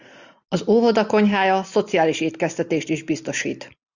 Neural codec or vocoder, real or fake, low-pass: none; real; 7.2 kHz